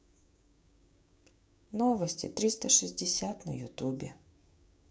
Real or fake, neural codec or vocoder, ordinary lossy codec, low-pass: fake; codec, 16 kHz, 6 kbps, DAC; none; none